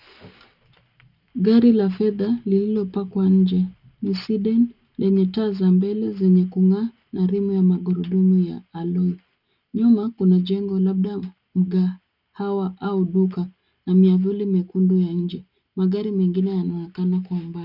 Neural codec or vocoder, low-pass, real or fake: none; 5.4 kHz; real